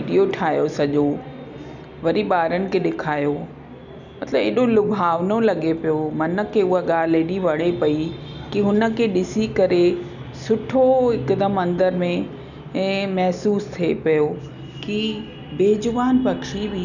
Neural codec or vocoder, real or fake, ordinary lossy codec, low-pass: none; real; none; 7.2 kHz